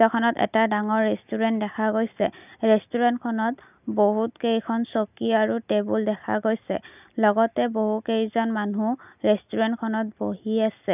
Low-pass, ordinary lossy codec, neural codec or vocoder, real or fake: 3.6 kHz; none; none; real